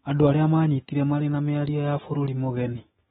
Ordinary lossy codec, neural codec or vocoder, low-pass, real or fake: AAC, 16 kbps; autoencoder, 48 kHz, 128 numbers a frame, DAC-VAE, trained on Japanese speech; 19.8 kHz; fake